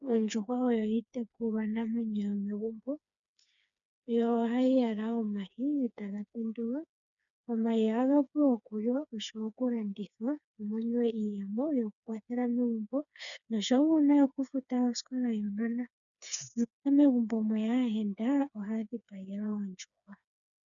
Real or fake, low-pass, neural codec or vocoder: fake; 7.2 kHz; codec, 16 kHz, 4 kbps, FreqCodec, smaller model